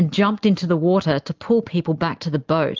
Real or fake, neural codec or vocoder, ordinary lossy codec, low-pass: real; none; Opus, 32 kbps; 7.2 kHz